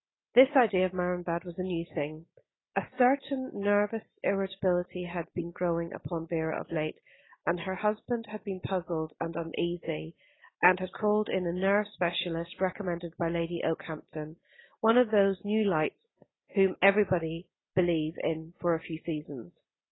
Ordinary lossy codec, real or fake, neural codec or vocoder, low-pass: AAC, 16 kbps; real; none; 7.2 kHz